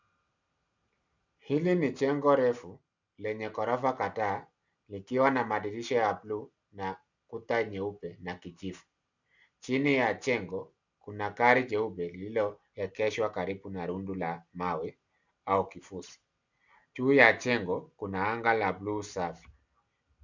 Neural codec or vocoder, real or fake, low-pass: none; real; 7.2 kHz